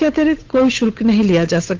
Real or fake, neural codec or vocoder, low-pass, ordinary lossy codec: fake; codec, 16 kHz, 8 kbps, FunCodec, trained on Chinese and English, 25 frames a second; 7.2 kHz; Opus, 16 kbps